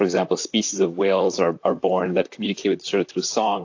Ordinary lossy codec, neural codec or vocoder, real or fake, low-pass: AAC, 48 kbps; vocoder, 44.1 kHz, 128 mel bands, Pupu-Vocoder; fake; 7.2 kHz